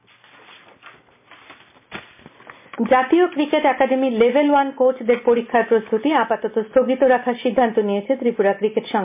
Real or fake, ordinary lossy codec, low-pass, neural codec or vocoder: real; MP3, 32 kbps; 3.6 kHz; none